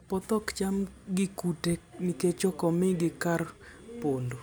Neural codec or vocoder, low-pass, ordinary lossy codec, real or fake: none; none; none; real